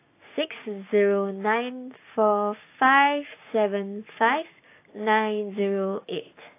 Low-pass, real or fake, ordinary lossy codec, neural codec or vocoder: 3.6 kHz; fake; AAC, 24 kbps; codec, 16 kHz, 6 kbps, DAC